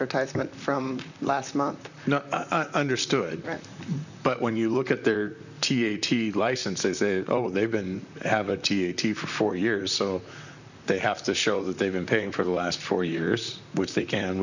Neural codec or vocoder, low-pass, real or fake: vocoder, 44.1 kHz, 128 mel bands, Pupu-Vocoder; 7.2 kHz; fake